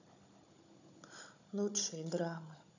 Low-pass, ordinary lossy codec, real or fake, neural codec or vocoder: 7.2 kHz; none; fake; codec, 16 kHz, 16 kbps, FunCodec, trained on Chinese and English, 50 frames a second